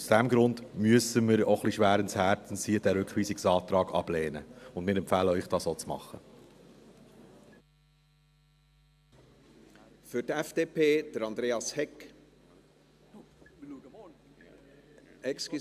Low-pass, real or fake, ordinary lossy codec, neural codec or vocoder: 14.4 kHz; real; AAC, 96 kbps; none